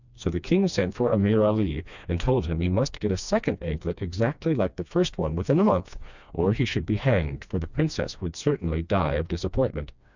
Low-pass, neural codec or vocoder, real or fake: 7.2 kHz; codec, 16 kHz, 2 kbps, FreqCodec, smaller model; fake